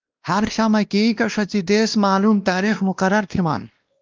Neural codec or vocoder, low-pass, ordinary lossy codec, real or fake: codec, 16 kHz, 1 kbps, X-Codec, WavLM features, trained on Multilingual LibriSpeech; 7.2 kHz; Opus, 24 kbps; fake